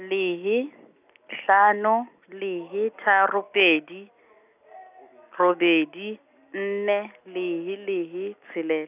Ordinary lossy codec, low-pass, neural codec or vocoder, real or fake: none; 3.6 kHz; none; real